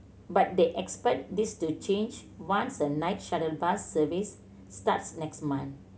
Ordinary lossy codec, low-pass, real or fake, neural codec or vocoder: none; none; real; none